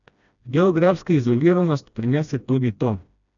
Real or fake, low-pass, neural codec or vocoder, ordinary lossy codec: fake; 7.2 kHz; codec, 16 kHz, 1 kbps, FreqCodec, smaller model; none